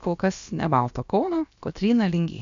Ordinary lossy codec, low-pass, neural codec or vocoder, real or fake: MP3, 64 kbps; 7.2 kHz; codec, 16 kHz, about 1 kbps, DyCAST, with the encoder's durations; fake